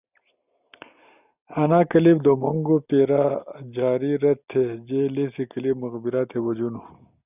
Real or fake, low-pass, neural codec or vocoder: real; 3.6 kHz; none